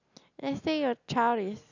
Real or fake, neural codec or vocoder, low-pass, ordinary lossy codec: real; none; 7.2 kHz; none